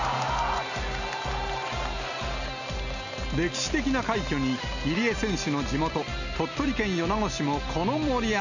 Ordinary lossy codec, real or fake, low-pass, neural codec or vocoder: none; real; 7.2 kHz; none